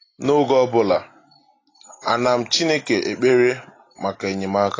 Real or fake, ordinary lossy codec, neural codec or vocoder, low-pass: real; AAC, 32 kbps; none; 7.2 kHz